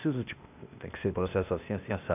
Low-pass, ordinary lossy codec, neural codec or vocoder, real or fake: 3.6 kHz; none; codec, 16 kHz, 0.8 kbps, ZipCodec; fake